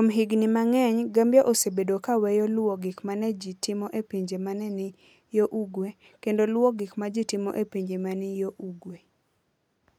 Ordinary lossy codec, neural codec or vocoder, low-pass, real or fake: none; none; 19.8 kHz; real